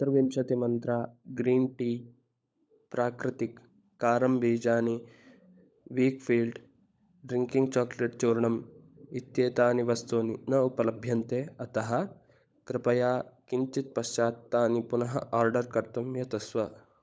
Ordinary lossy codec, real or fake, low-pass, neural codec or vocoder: none; fake; none; codec, 16 kHz, 16 kbps, FunCodec, trained on LibriTTS, 50 frames a second